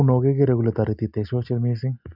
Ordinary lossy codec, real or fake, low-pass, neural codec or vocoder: none; real; 5.4 kHz; none